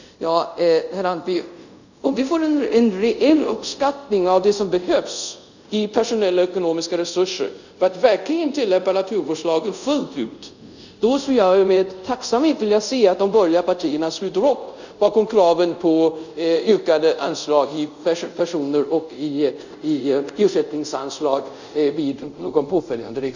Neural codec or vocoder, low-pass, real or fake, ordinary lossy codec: codec, 24 kHz, 0.5 kbps, DualCodec; 7.2 kHz; fake; none